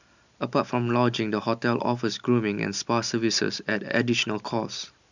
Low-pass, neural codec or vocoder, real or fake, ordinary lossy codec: 7.2 kHz; none; real; none